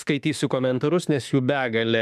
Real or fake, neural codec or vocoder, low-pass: fake; autoencoder, 48 kHz, 32 numbers a frame, DAC-VAE, trained on Japanese speech; 14.4 kHz